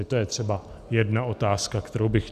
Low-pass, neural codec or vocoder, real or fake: 14.4 kHz; none; real